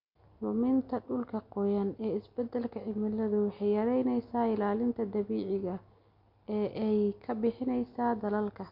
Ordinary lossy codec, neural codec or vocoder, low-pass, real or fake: none; none; 5.4 kHz; real